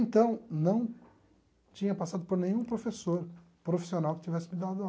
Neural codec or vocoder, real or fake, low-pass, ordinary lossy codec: none; real; none; none